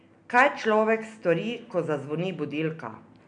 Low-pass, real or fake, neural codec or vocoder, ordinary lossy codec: 9.9 kHz; real; none; none